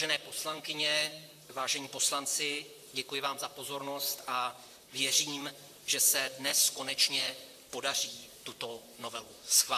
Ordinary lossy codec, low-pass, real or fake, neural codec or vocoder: AAC, 96 kbps; 14.4 kHz; fake; vocoder, 44.1 kHz, 128 mel bands, Pupu-Vocoder